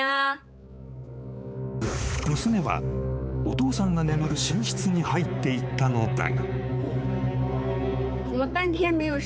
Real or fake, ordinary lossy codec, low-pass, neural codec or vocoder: fake; none; none; codec, 16 kHz, 4 kbps, X-Codec, HuBERT features, trained on balanced general audio